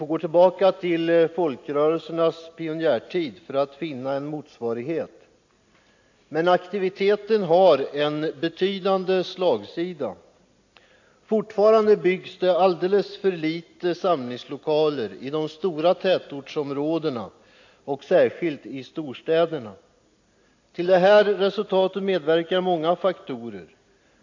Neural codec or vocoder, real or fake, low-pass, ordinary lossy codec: none; real; 7.2 kHz; AAC, 48 kbps